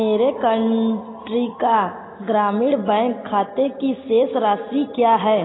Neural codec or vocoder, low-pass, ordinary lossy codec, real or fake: none; 7.2 kHz; AAC, 16 kbps; real